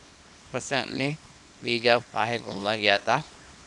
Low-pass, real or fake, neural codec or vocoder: 10.8 kHz; fake; codec, 24 kHz, 0.9 kbps, WavTokenizer, small release